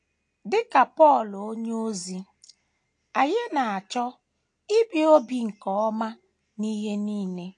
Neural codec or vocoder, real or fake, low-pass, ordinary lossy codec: none; real; 9.9 kHz; AAC, 48 kbps